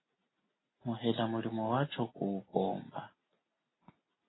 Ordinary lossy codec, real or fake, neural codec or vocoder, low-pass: AAC, 16 kbps; real; none; 7.2 kHz